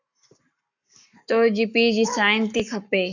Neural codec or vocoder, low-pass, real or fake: codec, 44.1 kHz, 7.8 kbps, Pupu-Codec; 7.2 kHz; fake